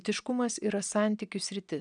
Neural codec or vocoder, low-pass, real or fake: none; 9.9 kHz; real